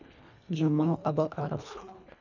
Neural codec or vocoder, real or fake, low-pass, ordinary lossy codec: codec, 24 kHz, 1.5 kbps, HILCodec; fake; 7.2 kHz; none